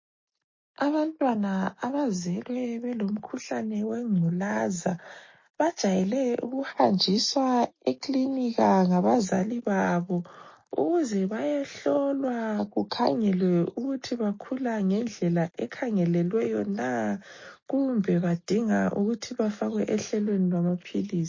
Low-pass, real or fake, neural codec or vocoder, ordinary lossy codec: 7.2 kHz; real; none; MP3, 32 kbps